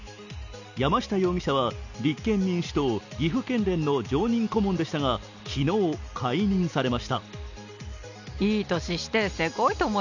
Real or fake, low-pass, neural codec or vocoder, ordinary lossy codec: real; 7.2 kHz; none; none